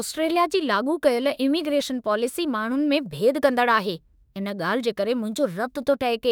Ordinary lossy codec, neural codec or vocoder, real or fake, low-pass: none; autoencoder, 48 kHz, 32 numbers a frame, DAC-VAE, trained on Japanese speech; fake; none